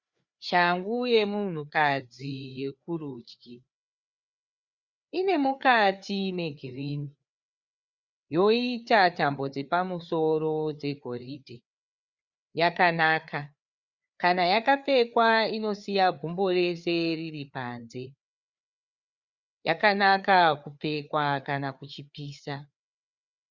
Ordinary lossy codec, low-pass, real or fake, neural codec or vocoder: Opus, 64 kbps; 7.2 kHz; fake; codec, 16 kHz, 4 kbps, FreqCodec, larger model